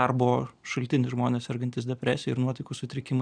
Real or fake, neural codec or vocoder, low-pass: real; none; 9.9 kHz